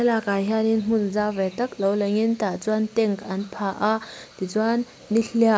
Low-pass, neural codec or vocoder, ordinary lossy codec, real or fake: none; none; none; real